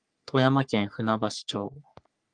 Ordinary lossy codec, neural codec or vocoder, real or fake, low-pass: Opus, 32 kbps; codec, 44.1 kHz, 3.4 kbps, Pupu-Codec; fake; 9.9 kHz